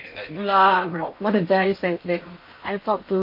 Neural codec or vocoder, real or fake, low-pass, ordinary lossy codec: codec, 16 kHz in and 24 kHz out, 0.8 kbps, FocalCodec, streaming, 65536 codes; fake; 5.4 kHz; MP3, 32 kbps